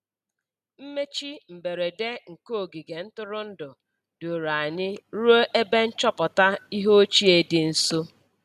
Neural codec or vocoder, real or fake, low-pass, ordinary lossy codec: none; real; 14.4 kHz; none